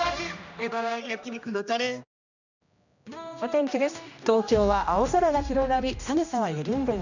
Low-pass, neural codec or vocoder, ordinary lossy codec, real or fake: 7.2 kHz; codec, 16 kHz, 1 kbps, X-Codec, HuBERT features, trained on general audio; none; fake